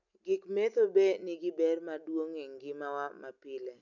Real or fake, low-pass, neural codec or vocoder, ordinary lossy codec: real; 7.2 kHz; none; none